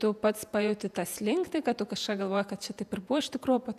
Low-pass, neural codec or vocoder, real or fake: 14.4 kHz; vocoder, 48 kHz, 128 mel bands, Vocos; fake